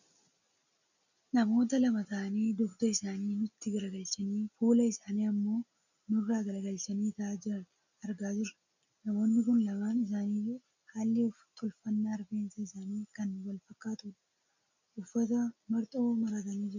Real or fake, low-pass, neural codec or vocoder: real; 7.2 kHz; none